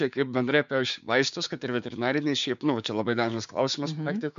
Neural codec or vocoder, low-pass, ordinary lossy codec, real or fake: codec, 16 kHz, 6 kbps, DAC; 7.2 kHz; MP3, 64 kbps; fake